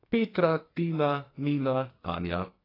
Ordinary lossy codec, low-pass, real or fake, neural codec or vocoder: AAC, 24 kbps; 5.4 kHz; fake; codec, 44.1 kHz, 2.6 kbps, SNAC